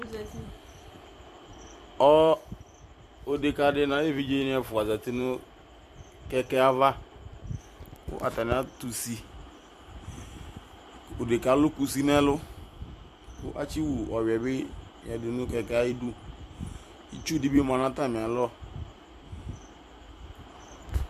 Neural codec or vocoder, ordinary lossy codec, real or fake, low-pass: vocoder, 44.1 kHz, 128 mel bands every 256 samples, BigVGAN v2; AAC, 96 kbps; fake; 14.4 kHz